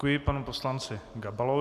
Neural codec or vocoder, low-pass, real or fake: none; 14.4 kHz; real